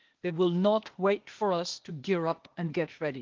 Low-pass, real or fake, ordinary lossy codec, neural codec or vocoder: 7.2 kHz; fake; Opus, 32 kbps; codec, 16 kHz, 0.8 kbps, ZipCodec